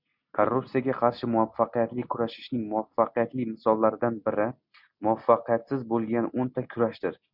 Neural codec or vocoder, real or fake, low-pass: none; real; 5.4 kHz